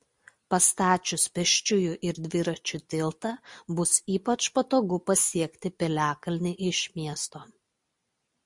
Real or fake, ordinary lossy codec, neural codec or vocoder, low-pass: real; MP3, 64 kbps; none; 10.8 kHz